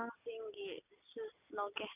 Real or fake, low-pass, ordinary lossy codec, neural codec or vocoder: real; 3.6 kHz; none; none